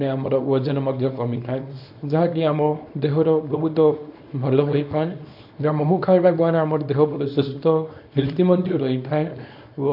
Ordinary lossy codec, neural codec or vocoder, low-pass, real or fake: AAC, 48 kbps; codec, 24 kHz, 0.9 kbps, WavTokenizer, small release; 5.4 kHz; fake